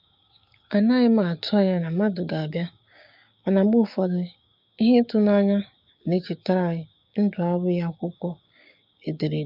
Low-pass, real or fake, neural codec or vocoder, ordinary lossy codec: 5.4 kHz; fake; codec, 16 kHz, 6 kbps, DAC; none